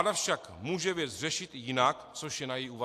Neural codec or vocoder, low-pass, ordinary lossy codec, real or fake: none; 14.4 kHz; AAC, 64 kbps; real